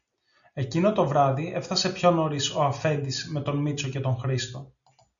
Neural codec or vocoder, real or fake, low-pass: none; real; 7.2 kHz